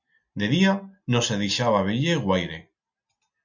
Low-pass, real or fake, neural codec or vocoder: 7.2 kHz; real; none